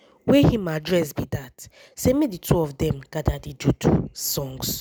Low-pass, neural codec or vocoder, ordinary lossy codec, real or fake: none; none; none; real